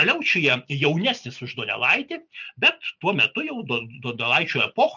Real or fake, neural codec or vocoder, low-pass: real; none; 7.2 kHz